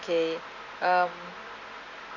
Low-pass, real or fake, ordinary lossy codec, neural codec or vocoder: 7.2 kHz; real; none; none